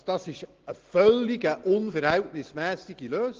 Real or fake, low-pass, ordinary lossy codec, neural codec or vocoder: real; 7.2 kHz; Opus, 16 kbps; none